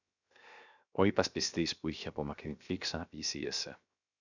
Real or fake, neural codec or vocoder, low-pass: fake; codec, 16 kHz, 0.7 kbps, FocalCodec; 7.2 kHz